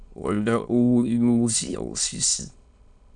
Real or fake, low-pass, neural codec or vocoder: fake; 9.9 kHz; autoencoder, 22.05 kHz, a latent of 192 numbers a frame, VITS, trained on many speakers